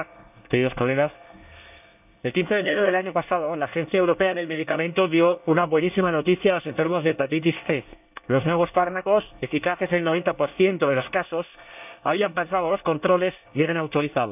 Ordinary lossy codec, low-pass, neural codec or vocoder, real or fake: none; 3.6 kHz; codec, 24 kHz, 1 kbps, SNAC; fake